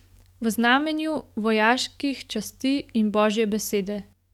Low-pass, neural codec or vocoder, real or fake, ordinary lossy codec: 19.8 kHz; codec, 44.1 kHz, 7.8 kbps, DAC; fake; none